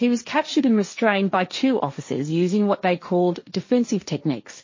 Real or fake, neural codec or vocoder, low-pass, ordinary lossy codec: fake; codec, 16 kHz, 1.1 kbps, Voila-Tokenizer; 7.2 kHz; MP3, 32 kbps